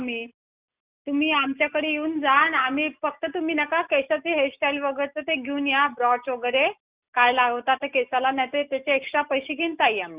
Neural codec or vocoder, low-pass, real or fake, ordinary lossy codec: none; 3.6 kHz; real; Opus, 64 kbps